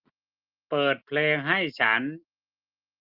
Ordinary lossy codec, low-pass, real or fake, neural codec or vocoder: Opus, 32 kbps; 5.4 kHz; real; none